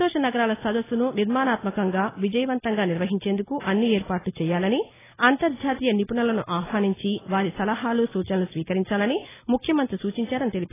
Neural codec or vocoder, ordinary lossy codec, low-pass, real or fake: none; AAC, 16 kbps; 3.6 kHz; real